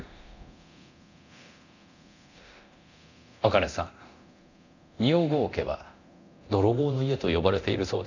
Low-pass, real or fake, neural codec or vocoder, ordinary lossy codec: 7.2 kHz; fake; codec, 24 kHz, 0.9 kbps, DualCodec; none